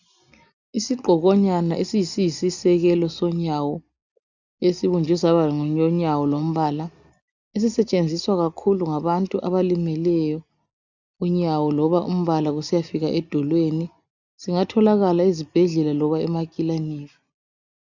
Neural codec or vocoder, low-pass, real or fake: none; 7.2 kHz; real